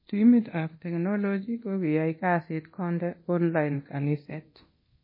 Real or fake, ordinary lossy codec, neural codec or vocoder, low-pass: fake; MP3, 24 kbps; codec, 24 kHz, 0.9 kbps, DualCodec; 5.4 kHz